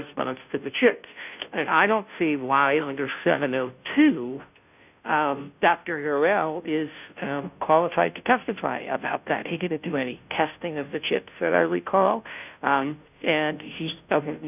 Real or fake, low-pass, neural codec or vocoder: fake; 3.6 kHz; codec, 16 kHz, 0.5 kbps, FunCodec, trained on Chinese and English, 25 frames a second